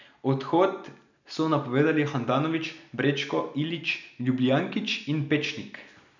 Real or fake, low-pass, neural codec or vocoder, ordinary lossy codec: real; 7.2 kHz; none; none